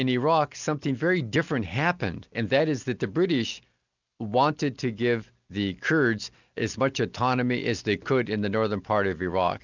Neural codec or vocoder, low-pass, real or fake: none; 7.2 kHz; real